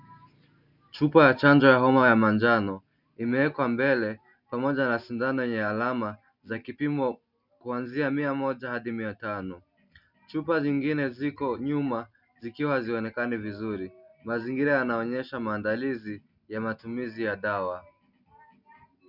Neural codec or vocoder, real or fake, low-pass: none; real; 5.4 kHz